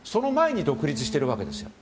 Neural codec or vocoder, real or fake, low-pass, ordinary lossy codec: none; real; none; none